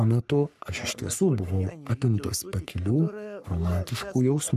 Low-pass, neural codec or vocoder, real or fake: 14.4 kHz; codec, 44.1 kHz, 3.4 kbps, Pupu-Codec; fake